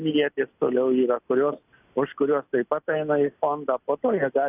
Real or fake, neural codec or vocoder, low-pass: real; none; 3.6 kHz